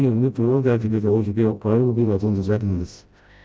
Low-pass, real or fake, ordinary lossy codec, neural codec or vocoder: none; fake; none; codec, 16 kHz, 0.5 kbps, FreqCodec, smaller model